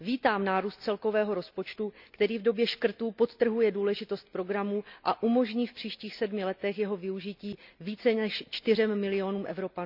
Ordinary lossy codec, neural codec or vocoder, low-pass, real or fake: none; none; 5.4 kHz; real